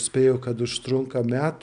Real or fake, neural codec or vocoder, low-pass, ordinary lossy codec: real; none; 9.9 kHz; MP3, 96 kbps